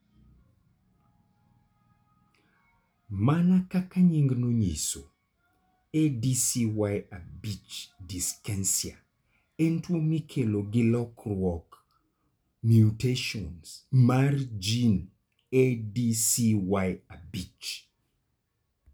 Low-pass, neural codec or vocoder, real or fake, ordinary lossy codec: none; none; real; none